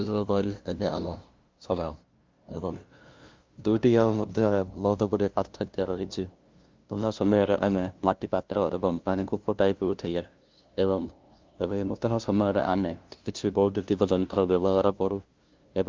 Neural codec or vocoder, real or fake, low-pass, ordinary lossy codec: codec, 16 kHz, 0.5 kbps, FunCodec, trained on LibriTTS, 25 frames a second; fake; 7.2 kHz; Opus, 32 kbps